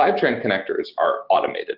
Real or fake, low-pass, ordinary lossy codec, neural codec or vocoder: real; 5.4 kHz; Opus, 16 kbps; none